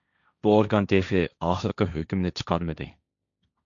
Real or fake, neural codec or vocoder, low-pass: fake; codec, 16 kHz, 1.1 kbps, Voila-Tokenizer; 7.2 kHz